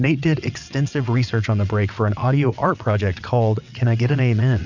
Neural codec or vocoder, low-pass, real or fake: vocoder, 22.05 kHz, 80 mel bands, WaveNeXt; 7.2 kHz; fake